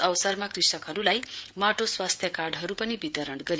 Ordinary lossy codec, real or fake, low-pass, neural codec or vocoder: none; fake; none; codec, 16 kHz, 16 kbps, FreqCodec, smaller model